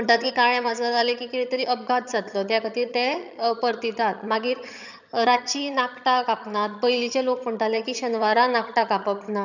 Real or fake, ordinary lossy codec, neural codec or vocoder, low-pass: fake; none; vocoder, 22.05 kHz, 80 mel bands, HiFi-GAN; 7.2 kHz